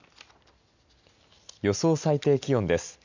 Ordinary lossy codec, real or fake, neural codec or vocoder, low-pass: none; real; none; 7.2 kHz